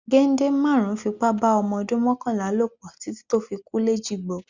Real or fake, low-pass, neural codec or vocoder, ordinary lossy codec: real; 7.2 kHz; none; Opus, 64 kbps